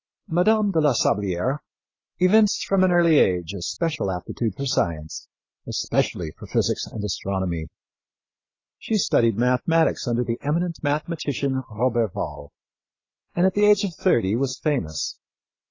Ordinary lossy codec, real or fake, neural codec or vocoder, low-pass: AAC, 32 kbps; real; none; 7.2 kHz